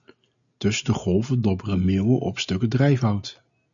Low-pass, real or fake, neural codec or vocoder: 7.2 kHz; real; none